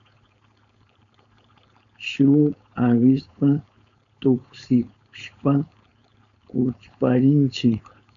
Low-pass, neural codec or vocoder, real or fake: 7.2 kHz; codec, 16 kHz, 4.8 kbps, FACodec; fake